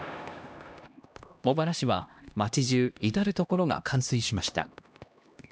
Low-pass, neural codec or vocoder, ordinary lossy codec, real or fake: none; codec, 16 kHz, 1 kbps, X-Codec, HuBERT features, trained on LibriSpeech; none; fake